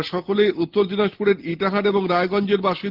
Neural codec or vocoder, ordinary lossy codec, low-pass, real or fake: none; Opus, 16 kbps; 5.4 kHz; real